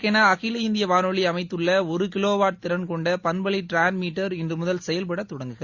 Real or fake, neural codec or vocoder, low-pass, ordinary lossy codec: real; none; 7.2 kHz; Opus, 64 kbps